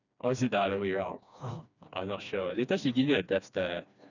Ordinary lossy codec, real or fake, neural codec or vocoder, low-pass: none; fake; codec, 16 kHz, 2 kbps, FreqCodec, smaller model; 7.2 kHz